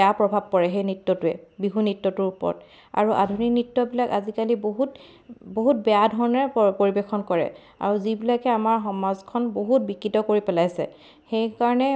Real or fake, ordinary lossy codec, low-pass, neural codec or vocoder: real; none; none; none